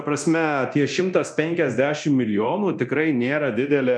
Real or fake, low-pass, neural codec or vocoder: fake; 9.9 kHz; codec, 24 kHz, 0.9 kbps, DualCodec